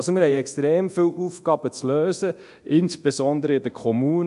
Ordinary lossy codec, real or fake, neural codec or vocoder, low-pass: AAC, 96 kbps; fake; codec, 24 kHz, 0.9 kbps, DualCodec; 10.8 kHz